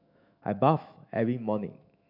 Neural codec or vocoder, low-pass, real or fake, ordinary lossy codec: autoencoder, 48 kHz, 128 numbers a frame, DAC-VAE, trained on Japanese speech; 5.4 kHz; fake; MP3, 48 kbps